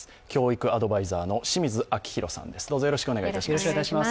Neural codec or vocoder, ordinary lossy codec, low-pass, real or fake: none; none; none; real